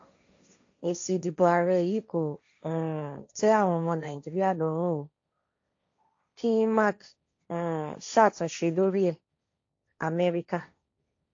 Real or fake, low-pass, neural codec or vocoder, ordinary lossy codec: fake; 7.2 kHz; codec, 16 kHz, 1.1 kbps, Voila-Tokenizer; AAC, 48 kbps